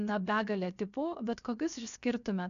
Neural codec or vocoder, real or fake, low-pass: codec, 16 kHz, 0.7 kbps, FocalCodec; fake; 7.2 kHz